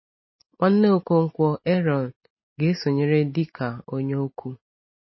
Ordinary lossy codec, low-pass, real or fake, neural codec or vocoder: MP3, 24 kbps; 7.2 kHz; real; none